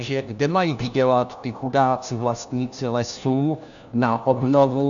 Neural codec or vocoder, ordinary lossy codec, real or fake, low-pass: codec, 16 kHz, 1 kbps, FunCodec, trained on LibriTTS, 50 frames a second; MP3, 96 kbps; fake; 7.2 kHz